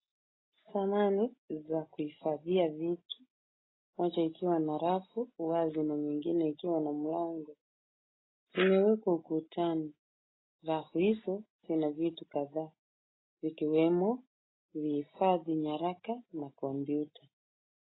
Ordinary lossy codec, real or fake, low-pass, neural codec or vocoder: AAC, 16 kbps; real; 7.2 kHz; none